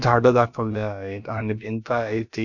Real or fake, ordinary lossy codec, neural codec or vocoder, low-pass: fake; none; codec, 16 kHz, about 1 kbps, DyCAST, with the encoder's durations; 7.2 kHz